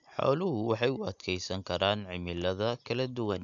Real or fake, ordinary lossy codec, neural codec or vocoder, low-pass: real; none; none; 7.2 kHz